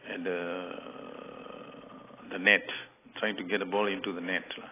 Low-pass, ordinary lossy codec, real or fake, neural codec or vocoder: 3.6 kHz; AAC, 16 kbps; real; none